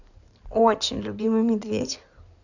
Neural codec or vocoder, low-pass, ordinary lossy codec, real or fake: codec, 16 kHz in and 24 kHz out, 2.2 kbps, FireRedTTS-2 codec; 7.2 kHz; none; fake